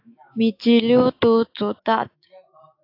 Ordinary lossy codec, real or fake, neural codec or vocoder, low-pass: AAC, 32 kbps; fake; autoencoder, 48 kHz, 128 numbers a frame, DAC-VAE, trained on Japanese speech; 5.4 kHz